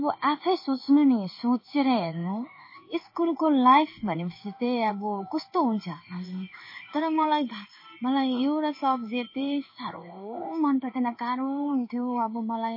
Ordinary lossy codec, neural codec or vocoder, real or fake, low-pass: MP3, 24 kbps; codec, 16 kHz in and 24 kHz out, 1 kbps, XY-Tokenizer; fake; 5.4 kHz